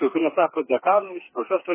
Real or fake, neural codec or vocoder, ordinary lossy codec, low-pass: fake; codec, 16 kHz, 4 kbps, FreqCodec, smaller model; MP3, 16 kbps; 3.6 kHz